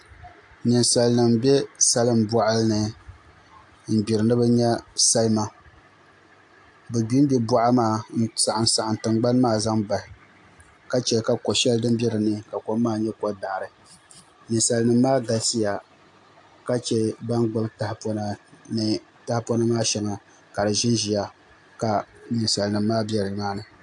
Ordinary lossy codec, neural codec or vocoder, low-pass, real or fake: AAC, 64 kbps; none; 10.8 kHz; real